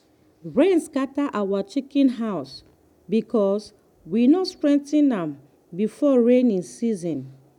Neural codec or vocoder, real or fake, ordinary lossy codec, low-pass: none; real; none; 19.8 kHz